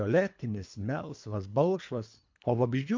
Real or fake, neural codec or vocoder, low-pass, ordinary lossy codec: fake; codec, 24 kHz, 3 kbps, HILCodec; 7.2 kHz; MP3, 48 kbps